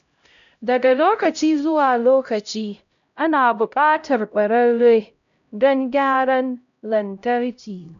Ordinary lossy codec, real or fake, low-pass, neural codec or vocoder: AAC, 96 kbps; fake; 7.2 kHz; codec, 16 kHz, 0.5 kbps, X-Codec, HuBERT features, trained on LibriSpeech